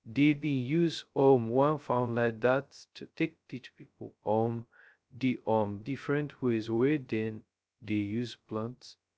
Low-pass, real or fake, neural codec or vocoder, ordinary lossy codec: none; fake; codec, 16 kHz, 0.2 kbps, FocalCodec; none